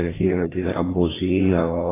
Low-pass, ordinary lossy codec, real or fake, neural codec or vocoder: 3.6 kHz; AAC, 16 kbps; fake; codec, 16 kHz, 1 kbps, FreqCodec, larger model